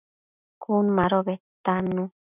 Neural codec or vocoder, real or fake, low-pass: none; real; 3.6 kHz